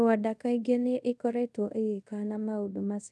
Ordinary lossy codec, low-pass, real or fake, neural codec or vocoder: none; none; fake; codec, 24 kHz, 0.5 kbps, DualCodec